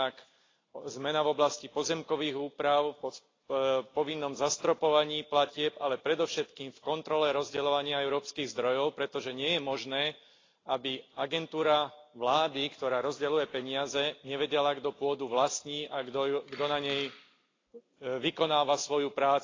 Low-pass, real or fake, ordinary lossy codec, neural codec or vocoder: 7.2 kHz; real; AAC, 32 kbps; none